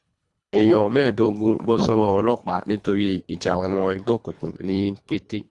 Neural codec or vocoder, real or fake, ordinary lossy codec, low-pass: codec, 24 kHz, 1.5 kbps, HILCodec; fake; Opus, 64 kbps; 10.8 kHz